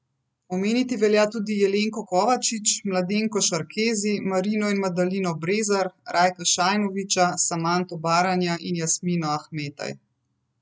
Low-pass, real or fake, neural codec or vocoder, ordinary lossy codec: none; real; none; none